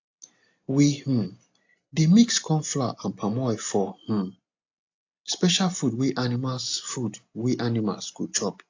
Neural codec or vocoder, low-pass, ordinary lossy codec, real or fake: none; 7.2 kHz; AAC, 48 kbps; real